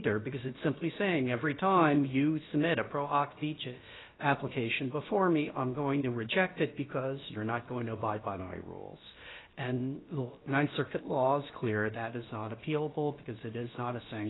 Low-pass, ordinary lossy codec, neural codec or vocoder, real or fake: 7.2 kHz; AAC, 16 kbps; codec, 16 kHz, about 1 kbps, DyCAST, with the encoder's durations; fake